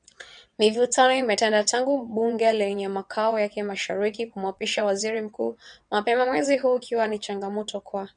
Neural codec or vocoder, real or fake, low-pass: vocoder, 22.05 kHz, 80 mel bands, WaveNeXt; fake; 9.9 kHz